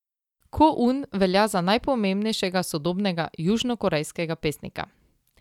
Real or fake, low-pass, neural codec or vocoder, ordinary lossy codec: real; 19.8 kHz; none; none